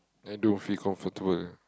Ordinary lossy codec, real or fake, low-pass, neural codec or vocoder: none; real; none; none